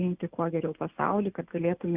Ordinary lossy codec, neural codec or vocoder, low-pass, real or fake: Opus, 64 kbps; none; 3.6 kHz; real